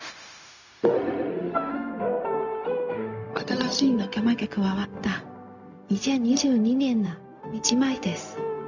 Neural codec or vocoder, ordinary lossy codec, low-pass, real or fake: codec, 16 kHz, 0.4 kbps, LongCat-Audio-Codec; none; 7.2 kHz; fake